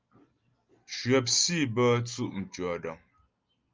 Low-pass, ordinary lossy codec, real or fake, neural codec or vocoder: 7.2 kHz; Opus, 24 kbps; real; none